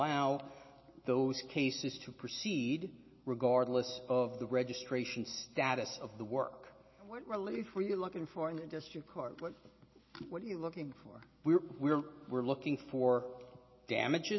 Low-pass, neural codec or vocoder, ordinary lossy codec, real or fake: 7.2 kHz; none; MP3, 24 kbps; real